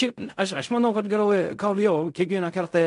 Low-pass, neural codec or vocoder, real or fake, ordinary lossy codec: 10.8 kHz; codec, 16 kHz in and 24 kHz out, 0.4 kbps, LongCat-Audio-Codec, fine tuned four codebook decoder; fake; MP3, 64 kbps